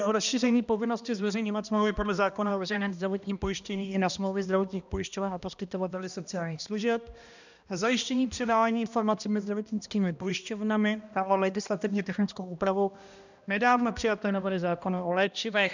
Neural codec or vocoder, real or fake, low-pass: codec, 16 kHz, 1 kbps, X-Codec, HuBERT features, trained on balanced general audio; fake; 7.2 kHz